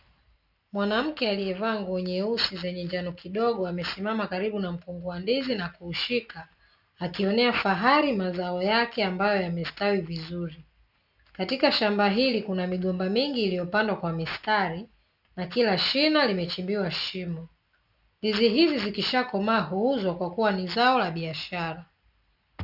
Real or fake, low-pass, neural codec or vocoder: real; 5.4 kHz; none